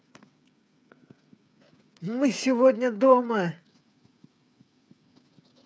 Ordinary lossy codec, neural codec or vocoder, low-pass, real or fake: none; codec, 16 kHz, 8 kbps, FreqCodec, smaller model; none; fake